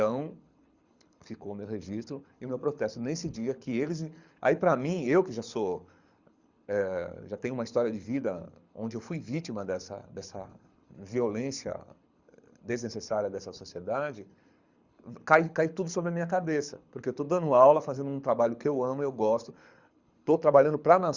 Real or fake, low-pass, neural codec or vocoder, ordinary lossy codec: fake; 7.2 kHz; codec, 24 kHz, 6 kbps, HILCodec; Opus, 64 kbps